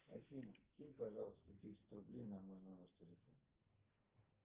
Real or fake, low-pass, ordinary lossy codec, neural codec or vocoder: fake; 3.6 kHz; Opus, 16 kbps; codec, 44.1 kHz, 2.6 kbps, SNAC